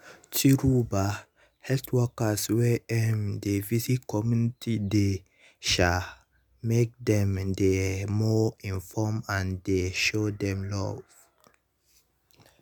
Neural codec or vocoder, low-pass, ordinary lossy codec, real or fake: none; none; none; real